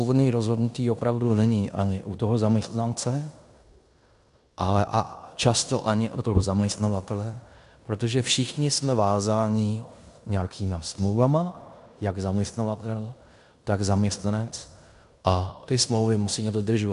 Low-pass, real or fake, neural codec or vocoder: 10.8 kHz; fake; codec, 16 kHz in and 24 kHz out, 0.9 kbps, LongCat-Audio-Codec, fine tuned four codebook decoder